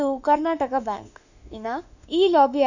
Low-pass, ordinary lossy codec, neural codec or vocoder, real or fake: 7.2 kHz; none; autoencoder, 48 kHz, 32 numbers a frame, DAC-VAE, trained on Japanese speech; fake